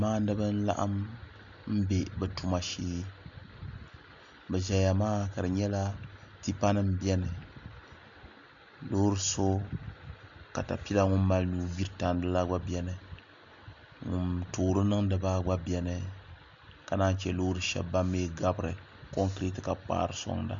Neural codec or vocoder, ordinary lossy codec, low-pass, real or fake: none; MP3, 64 kbps; 7.2 kHz; real